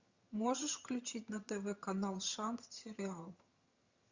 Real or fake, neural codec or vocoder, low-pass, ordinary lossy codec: fake; vocoder, 22.05 kHz, 80 mel bands, HiFi-GAN; 7.2 kHz; Opus, 64 kbps